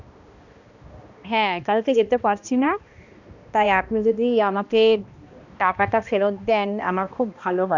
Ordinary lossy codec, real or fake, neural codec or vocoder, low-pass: none; fake; codec, 16 kHz, 1 kbps, X-Codec, HuBERT features, trained on balanced general audio; 7.2 kHz